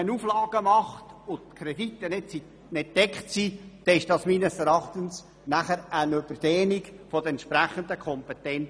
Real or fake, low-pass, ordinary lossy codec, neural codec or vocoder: real; none; none; none